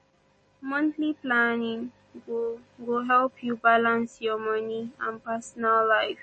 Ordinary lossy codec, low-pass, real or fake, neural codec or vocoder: MP3, 32 kbps; 10.8 kHz; real; none